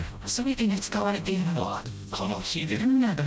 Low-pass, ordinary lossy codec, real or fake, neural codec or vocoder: none; none; fake; codec, 16 kHz, 0.5 kbps, FreqCodec, smaller model